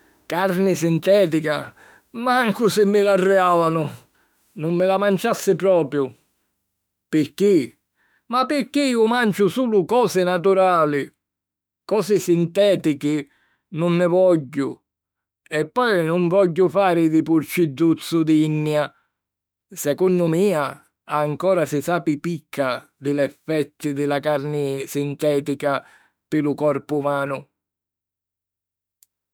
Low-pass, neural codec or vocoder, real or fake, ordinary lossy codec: none; autoencoder, 48 kHz, 32 numbers a frame, DAC-VAE, trained on Japanese speech; fake; none